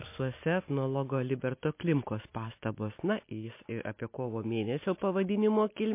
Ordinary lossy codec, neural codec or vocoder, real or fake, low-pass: MP3, 24 kbps; none; real; 3.6 kHz